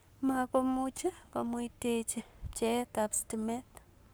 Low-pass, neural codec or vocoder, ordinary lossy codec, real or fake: none; codec, 44.1 kHz, 7.8 kbps, Pupu-Codec; none; fake